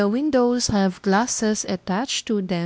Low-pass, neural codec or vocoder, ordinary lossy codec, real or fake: none; codec, 16 kHz, 1 kbps, X-Codec, WavLM features, trained on Multilingual LibriSpeech; none; fake